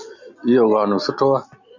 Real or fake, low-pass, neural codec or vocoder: real; 7.2 kHz; none